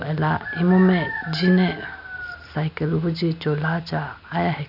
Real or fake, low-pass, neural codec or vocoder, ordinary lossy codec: real; 5.4 kHz; none; none